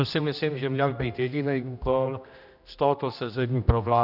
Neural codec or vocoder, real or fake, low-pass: codec, 16 kHz, 1 kbps, X-Codec, HuBERT features, trained on general audio; fake; 5.4 kHz